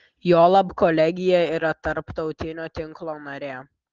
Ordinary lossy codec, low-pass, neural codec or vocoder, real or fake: Opus, 16 kbps; 7.2 kHz; none; real